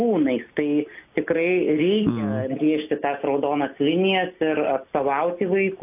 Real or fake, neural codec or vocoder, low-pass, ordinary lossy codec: real; none; 3.6 kHz; AAC, 32 kbps